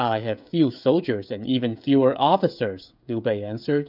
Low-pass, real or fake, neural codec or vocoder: 5.4 kHz; fake; codec, 16 kHz, 16 kbps, FreqCodec, smaller model